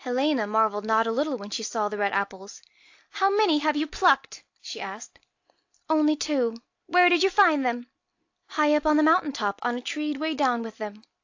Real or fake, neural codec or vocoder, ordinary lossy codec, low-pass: real; none; AAC, 48 kbps; 7.2 kHz